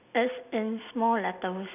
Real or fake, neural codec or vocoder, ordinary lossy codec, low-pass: real; none; none; 3.6 kHz